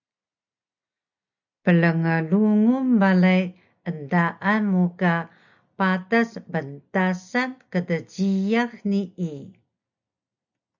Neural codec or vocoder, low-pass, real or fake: none; 7.2 kHz; real